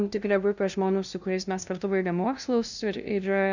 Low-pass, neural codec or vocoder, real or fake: 7.2 kHz; codec, 16 kHz, 0.5 kbps, FunCodec, trained on LibriTTS, 25 frames a second; fake